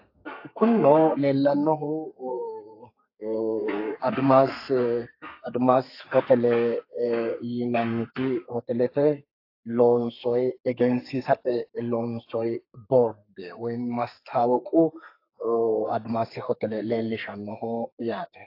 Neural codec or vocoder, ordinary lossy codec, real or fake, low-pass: codec, 32 kHz, 1.9 kbps, SNAC; AAC, 32 kbps; fake; 5.4 kHz